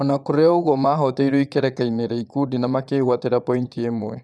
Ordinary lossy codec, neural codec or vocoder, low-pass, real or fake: none; vocoder, 22.05 kHz, 80 mel bands, Vocos; none; fake